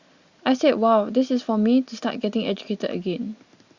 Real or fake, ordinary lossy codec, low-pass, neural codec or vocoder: real; Opus, 64 kbps; 7.2 kHz; none